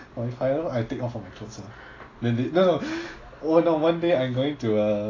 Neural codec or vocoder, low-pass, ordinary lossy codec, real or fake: none; 7.2 kHz; AAC, 32 kbps; real